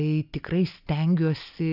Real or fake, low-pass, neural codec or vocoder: real; 5.4 kHz; none